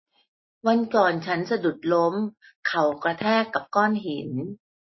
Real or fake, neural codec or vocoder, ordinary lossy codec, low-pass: real; none; MP3, 24 kbps; 7.2 kHz